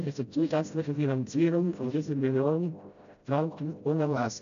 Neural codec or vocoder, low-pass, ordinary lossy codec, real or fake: codec, 16 kHz, 0.5 kbps, FreqCodec, smaller model; 7.2 kHz; none; fake